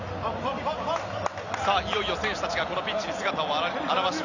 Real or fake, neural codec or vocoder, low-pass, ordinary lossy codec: real; none; 7.2 kHz; none